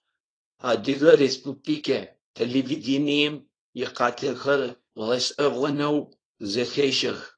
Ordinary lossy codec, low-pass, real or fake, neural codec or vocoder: AAC, 32 kbps; 9.9 kHz; fake; codec, 24 kHz, 0.9 kbps, WavTokenizer, small release